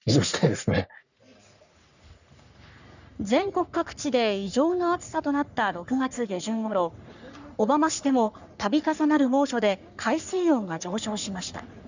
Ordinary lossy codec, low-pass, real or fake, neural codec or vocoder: none; 7.2 kHz; fake; codec, 44.1 kHz, 3.4 kbps, Pupu-Codec